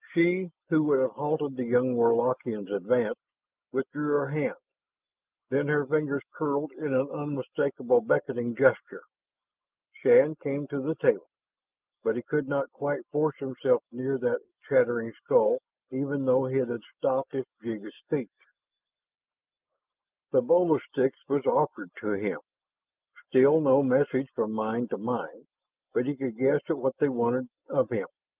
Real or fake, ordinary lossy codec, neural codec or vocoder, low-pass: real; Opus, 24 kbps; none; 3.6 kHz